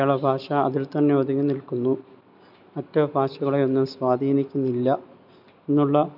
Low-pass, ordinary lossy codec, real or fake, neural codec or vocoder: 5.4 kHz; none; real; none